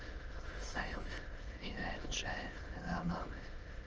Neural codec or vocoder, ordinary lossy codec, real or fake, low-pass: autoencoder, 22.05 kHz, a latent of 192 numbers a frame, VITS, trained on many speakers; Opus, 24 kbps; fake; 7.2 kHz